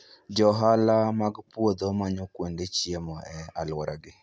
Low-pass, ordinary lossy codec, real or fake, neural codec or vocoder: none; none; real; none